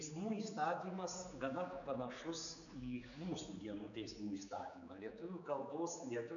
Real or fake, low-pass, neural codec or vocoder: fake; 7.2 kHz; codec, 16 kHz, 4 kbps, X-Codec, HuBERT features, trained on general audio